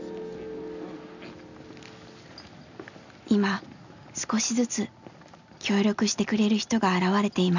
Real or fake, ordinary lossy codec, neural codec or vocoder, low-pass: real; none; none; 7.2 kHz